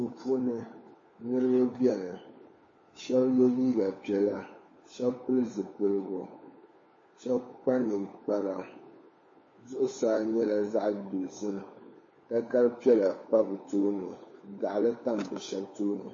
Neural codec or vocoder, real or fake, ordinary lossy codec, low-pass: codec, 16 kHz, 4 kbps, FunCodec, trained on LibriTTS, 50 frames a second; fake; MP3, 32 kbps; 7.2 kHz